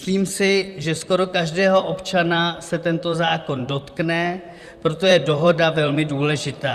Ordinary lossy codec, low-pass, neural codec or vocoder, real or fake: Opus, 64 kbps; 14.4 kHz; vocoder, 44.1 kHz, 128 mel bands, Pupu-Vocoder; fake